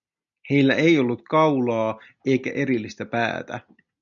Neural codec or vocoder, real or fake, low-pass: none; real; 7.2 kHz